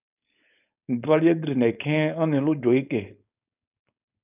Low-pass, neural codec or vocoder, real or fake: 3.6 kHz; codec, 16 kHz, 4.8 kbps, FACodec; fake